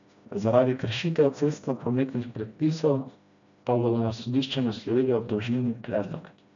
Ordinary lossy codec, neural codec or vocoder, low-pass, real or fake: AAC, 64 kbps; codec, 16 kHz, 1 kbps, FreqCodec, smaller model; 7.2 kHz; fake